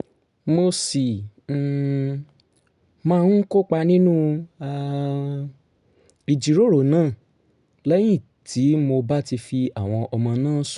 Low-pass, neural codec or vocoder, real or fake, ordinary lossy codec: 10.8 kHz; none; real; none